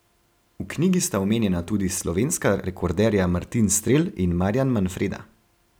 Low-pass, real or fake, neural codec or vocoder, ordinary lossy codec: none; real; none; none